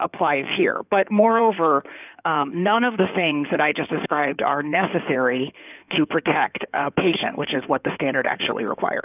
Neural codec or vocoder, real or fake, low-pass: codec, 16 kHz in and 24 kHz out, 2.2 kbps, FireRedTTS-2 codec; fake; 3.6 kHz